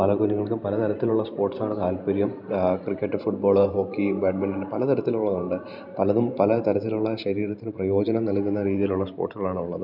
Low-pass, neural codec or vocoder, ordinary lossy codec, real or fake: 5.4 kHz; vocoder, 44.1 kHz, 128 mel bands every 512 samples, BigVGAN v2; none; fake